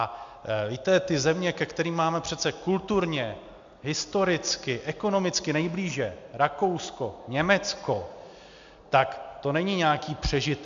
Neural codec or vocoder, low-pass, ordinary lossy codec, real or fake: none; 7.2 kHz; MP3, 64 kbps; real